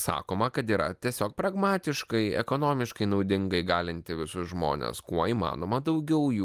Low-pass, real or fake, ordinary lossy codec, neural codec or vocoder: 14.4 kHz; real; Opus, 32 kbps; none